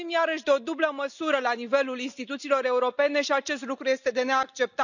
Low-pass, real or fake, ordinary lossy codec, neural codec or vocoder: 7.2 kHz; real; none; none